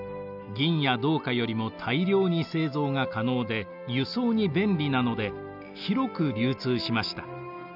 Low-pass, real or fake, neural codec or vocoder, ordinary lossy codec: 5.4 kHz; real; none; none